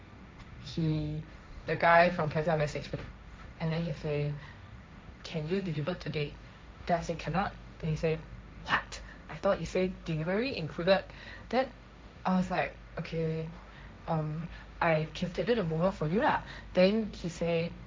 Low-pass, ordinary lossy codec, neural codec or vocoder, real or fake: none; none; codec, 16 kHz, 1.1 kbps, Voila-Tokenizer; fake